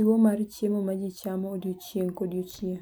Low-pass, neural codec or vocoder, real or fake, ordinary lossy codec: none; none; real; none